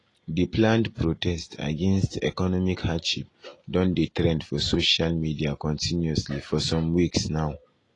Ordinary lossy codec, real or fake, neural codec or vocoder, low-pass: AAC, 32 kbps; real; none; 10.8 kHz